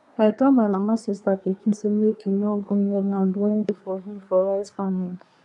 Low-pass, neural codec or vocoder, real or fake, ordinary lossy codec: 10.8 kHz; codec, 24 kHz, 1 kbps, SNAC; fake; none